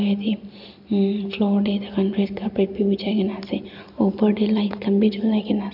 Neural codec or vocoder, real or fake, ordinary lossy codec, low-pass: none; real; none; 5.4 kHz